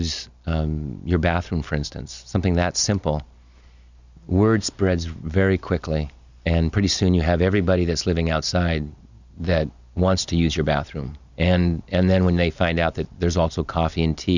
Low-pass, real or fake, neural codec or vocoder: 7.2 kHz; real; none